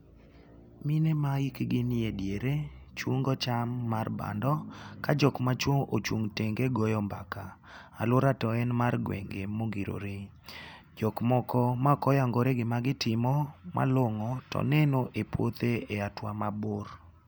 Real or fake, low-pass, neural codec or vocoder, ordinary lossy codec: real; none; none; none